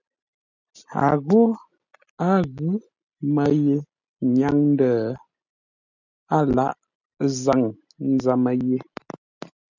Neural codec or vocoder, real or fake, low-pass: none; real; 7.2 kHz